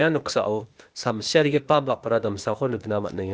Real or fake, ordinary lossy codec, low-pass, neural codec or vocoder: fake; none; none; codec, 16 kHz, 0.8 kbps, ZipCodec